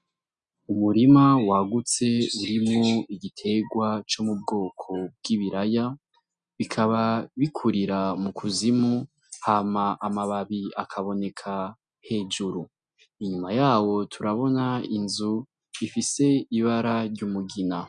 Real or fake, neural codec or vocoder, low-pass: real; none; 9.9 kHz